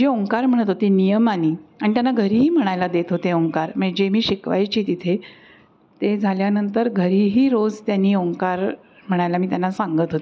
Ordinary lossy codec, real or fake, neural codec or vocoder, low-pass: none; real; none; none